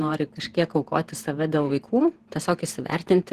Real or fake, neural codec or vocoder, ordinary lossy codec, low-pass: fake; vocoder, 48 kHz, 128 mel bands, Vocos; Opus, 16 kbps; 14.4 kHz